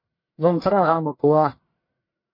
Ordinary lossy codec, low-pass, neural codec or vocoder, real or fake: MP3, 32 kbps; 5.4 kHz; codec, 44.1 kHz, 1.7 kbps, Pupu-Codec; fake